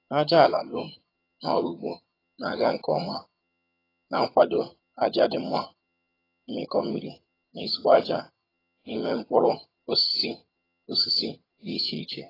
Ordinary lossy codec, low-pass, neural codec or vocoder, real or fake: AAC, 24 kbps; 5.4 kHz; vocoder, 22.05 kHz, 80 mel bands, HiFi-GAN; fake